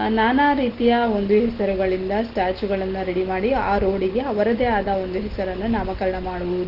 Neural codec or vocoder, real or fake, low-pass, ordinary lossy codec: none; real; 5.4 kHz; Opus, 16 kbps